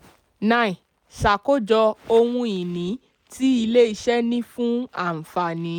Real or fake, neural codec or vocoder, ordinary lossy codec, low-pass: real; none; none; 19.8 kHz